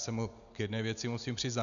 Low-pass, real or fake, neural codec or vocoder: 7.2 kHz; real; none